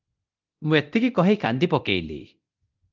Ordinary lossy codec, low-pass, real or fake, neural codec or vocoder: Opus, 24 kbps; 7.2 kHz; fake; codec, 24 kHz, 0.9 kbps, DualCodec